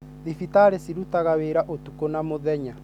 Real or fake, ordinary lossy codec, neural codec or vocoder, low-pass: real; none; none; 19.8 kHz